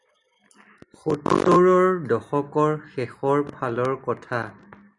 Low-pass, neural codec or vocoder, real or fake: 10.8 kHz; none; real